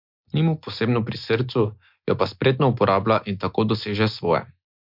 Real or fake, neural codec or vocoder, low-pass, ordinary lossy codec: real; none; 5.4 kHz; MP3, 48 kbps